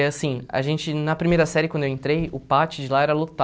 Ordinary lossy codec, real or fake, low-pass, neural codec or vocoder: none; real; none; none